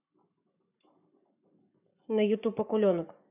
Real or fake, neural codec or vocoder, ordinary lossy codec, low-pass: fake; codec, 44.1 kHz, 7.8 kbps, Pupu-Codec; none; 3.6 kHz